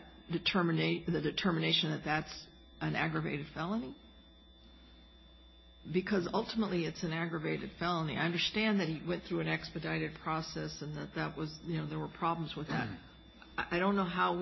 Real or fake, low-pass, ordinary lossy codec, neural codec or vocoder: real; 7.2 kHz; MP3, 24 kbps; none